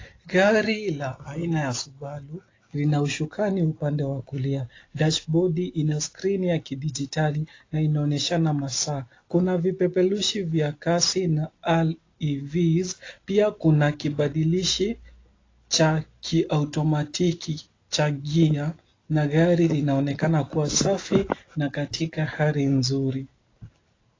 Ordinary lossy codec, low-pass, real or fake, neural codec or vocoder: AAC, 32 kbps; 7.2 kHz; real; none